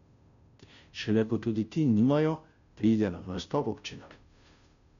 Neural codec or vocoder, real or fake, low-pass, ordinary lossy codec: codec, 16 kHz, 0.5 kbps, FunCodec, trained on Chinese and English, 25 frames a second; fake; 7.2 kHz; none